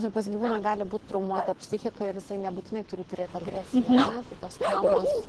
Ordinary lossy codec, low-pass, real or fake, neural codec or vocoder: Opus, 16 kbps; 10.8 kHz; fake; codec, 24 kHz, 3 kbps, HILCodec